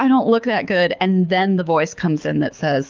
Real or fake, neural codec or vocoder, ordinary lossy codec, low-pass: fake; codec, 24 kHz, 6 kbps, HILCodec; Opus, 24 kbps; 7.2 kHz